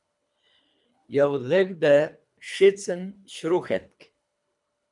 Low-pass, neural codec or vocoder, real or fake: 10.8 kHz; codec, 24 kHz, 3 kbps, HILCodec; fake